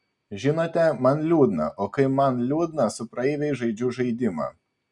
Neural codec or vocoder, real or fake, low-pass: none; real; 10.8 kHz